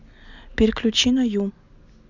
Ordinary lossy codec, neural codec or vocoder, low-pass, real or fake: none; none; 7.2 kHz; real